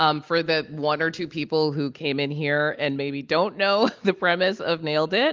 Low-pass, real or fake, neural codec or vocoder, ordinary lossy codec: 7.2 kHz; real; none; Opus, 32 kbps